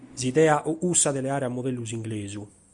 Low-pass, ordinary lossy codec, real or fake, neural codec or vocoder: 10.8 kHz; Opus, 64 kbps; real; none